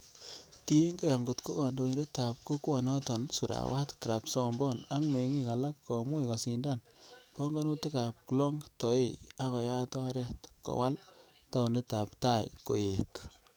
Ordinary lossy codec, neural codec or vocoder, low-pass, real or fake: none; codec, 44.1 kHz, 7.8 kbps, DAC; none; fake